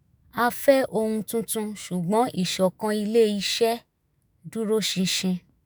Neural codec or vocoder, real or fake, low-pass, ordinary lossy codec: autoencoder, 48 kHz, 128 numbers a frame, DAC-VAE, trained on Japanese speech; fake; none; none